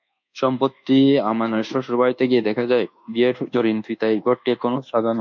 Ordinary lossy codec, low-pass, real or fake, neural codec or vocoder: MP3, 64 kbps; 7.2 kHz; fake; codec, 24 kHz, 1.2 kbps, DualCodec